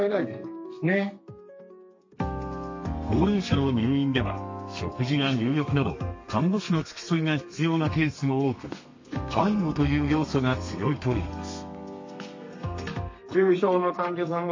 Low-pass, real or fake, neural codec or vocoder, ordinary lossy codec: 7.2 kHz; fake; codec, 32 kHz, 1.9 kbps, SNAC; MP3, 32 kbps